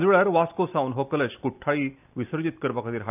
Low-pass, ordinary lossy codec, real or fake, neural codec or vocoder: 3.6 kHz; none; real; none